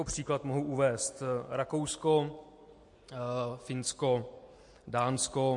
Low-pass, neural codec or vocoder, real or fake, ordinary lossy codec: 10.8 kHz; none; real; MP3, 48 kbps